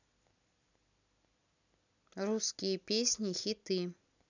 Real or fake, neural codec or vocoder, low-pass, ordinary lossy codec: real; none; 7.2 kHz; none